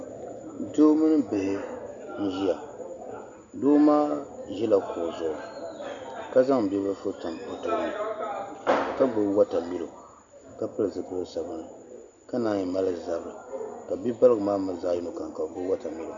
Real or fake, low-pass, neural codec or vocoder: real; 7.2 kHz; none